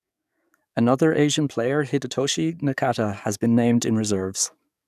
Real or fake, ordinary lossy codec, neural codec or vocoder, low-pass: fake; none; codec, 44.1 kHz, 7.8 kbps, DAC; 14.4 kHz